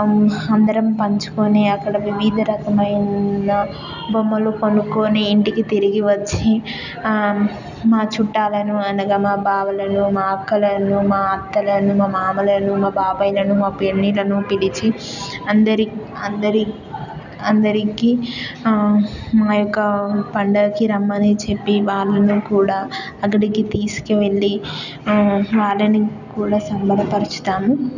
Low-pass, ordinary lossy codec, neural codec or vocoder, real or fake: 7.2 kHz; none; none; real